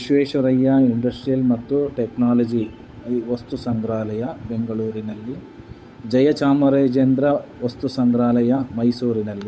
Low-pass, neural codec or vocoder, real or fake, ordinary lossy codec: none; codec, 16 kHz, 8 kbps, FunCodec, trained on Chinese and English, 25 frames a second; fake; none